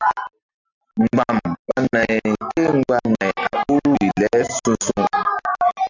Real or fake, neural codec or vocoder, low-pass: real; none; 7.2 kHz